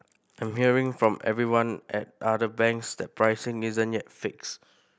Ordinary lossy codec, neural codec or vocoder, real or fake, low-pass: none; none; real; none